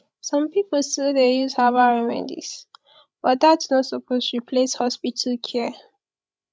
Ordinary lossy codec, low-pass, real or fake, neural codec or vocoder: none; none; fake; codec, 16 kHz, 16 kbps, FreqCodec, larger model